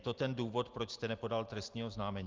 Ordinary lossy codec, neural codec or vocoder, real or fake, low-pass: Opus, 32 kbps; none; real; 7.2 kHz